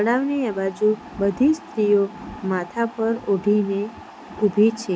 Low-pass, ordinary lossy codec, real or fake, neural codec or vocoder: none; none; real; none